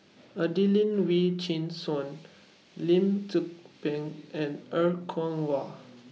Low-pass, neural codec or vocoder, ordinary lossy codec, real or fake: none; none; none; real